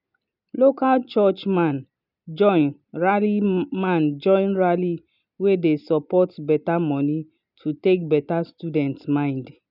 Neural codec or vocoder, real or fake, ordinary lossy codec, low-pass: none; real; none; 5.4 kHz